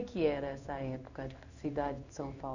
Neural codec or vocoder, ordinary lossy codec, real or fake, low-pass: codec, 16 kHz in and 24 kHz out, 1 kbps, XY-Tokenizer; none; fake; 7.2 kHz